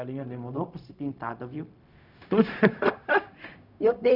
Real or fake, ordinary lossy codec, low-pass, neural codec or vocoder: fake; none; 5.4 kHz; codec, 16 kHz, 0.4 kbps, LongCat-Audio-Codec